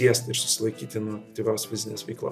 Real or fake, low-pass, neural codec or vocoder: real; 14.4 kHz; none